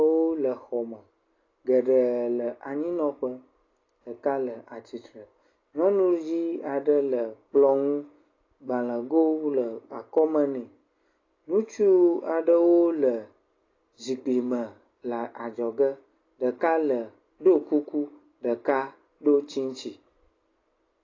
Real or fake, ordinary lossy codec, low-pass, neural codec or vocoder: real; AAC, 32 kbps; 7.2 kHz; none